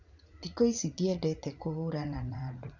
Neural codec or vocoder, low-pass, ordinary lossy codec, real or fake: vocoder, 44.1 kHz, 80 mel bands, Vocos; 7.2 kHz; none; fake